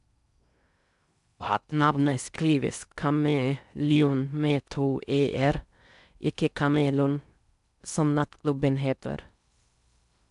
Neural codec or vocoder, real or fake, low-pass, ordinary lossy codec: codec, 16 kHz in and 24 kHz out, 0.6 kbps, FocalCodec, streaming, 4096 codes; fake; 10.8 kHz; none